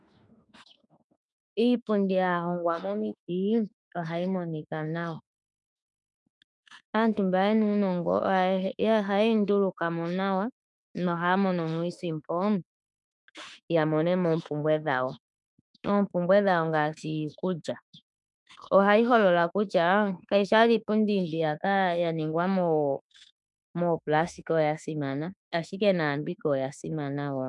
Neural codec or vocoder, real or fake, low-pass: autoencoder, 48 kHz, 32 numbers a frame, DAC-VAE, trained on Japanese speech; fake; 10.8 kHz